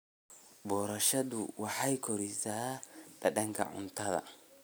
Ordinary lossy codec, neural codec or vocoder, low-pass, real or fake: none; none; none; real